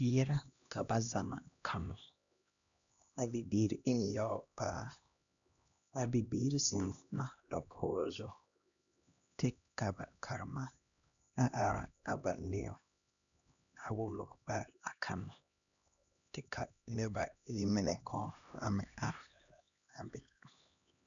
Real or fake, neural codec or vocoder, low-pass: fake; codec, 16 kHz, 1 kbps, X-Codec, HuBERT features, trained on LibriSpeech; 7.2 kHz